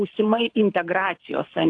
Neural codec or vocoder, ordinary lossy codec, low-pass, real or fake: codec, 24 kHz, 6 kbps, HILCodec; AAC, 48 kbps; 9.9 kHz; fake